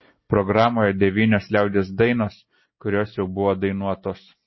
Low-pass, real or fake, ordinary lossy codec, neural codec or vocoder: 7.2 kHz; real; MP3, 24 kbps; none